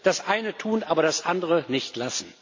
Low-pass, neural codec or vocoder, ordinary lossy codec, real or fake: 7.2 kHz; none; MP3, 48 kbps; real